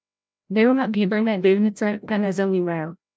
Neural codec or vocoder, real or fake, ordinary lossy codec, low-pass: codec, 16 kHz, 0.5 kbps, FreqCodec, larger model; fake; none; none